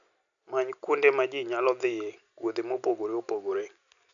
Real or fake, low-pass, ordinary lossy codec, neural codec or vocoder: real; 7.2 kHz; none; none